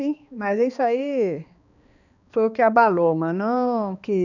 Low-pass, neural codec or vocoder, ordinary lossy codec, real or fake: 7.2 kHz; codec, 16 kHz, 2 kbps, X-Codec, HuBERT features, trained on balanced general audio; none; fake